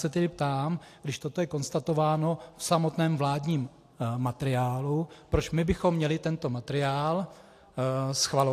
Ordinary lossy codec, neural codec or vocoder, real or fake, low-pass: AAC, 64 kbps; none; real; 14.4 kHz